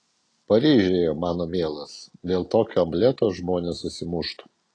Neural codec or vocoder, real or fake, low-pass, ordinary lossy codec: none; real; 9.9 kHz; AAC, 32 kbps